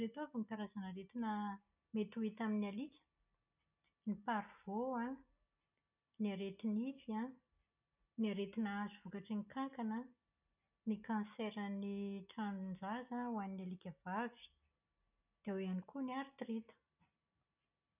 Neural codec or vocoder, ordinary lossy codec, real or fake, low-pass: none; none; real; 3.6 kHz